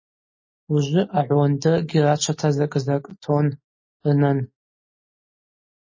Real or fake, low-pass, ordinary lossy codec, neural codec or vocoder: real; 7.2 kHz; MP3, 32 kbps; none